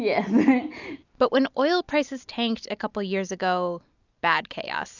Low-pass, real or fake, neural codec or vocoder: 7.2 kHz; real; none